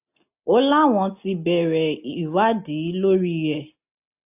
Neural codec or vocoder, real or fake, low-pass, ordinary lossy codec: none; real; 3.6 kHz; AAC, 32 kbps